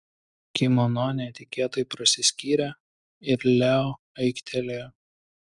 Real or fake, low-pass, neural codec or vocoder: real; 10.8 kHz; none